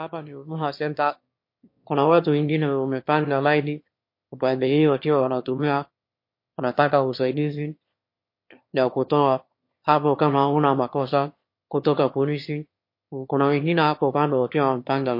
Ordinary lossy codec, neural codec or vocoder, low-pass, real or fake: MP3, 32 kbps; autoencoder, 22.05 kHz, a latent of 192 numbers a frame, VITS, trained on one speaker; 5.4 kHz; fake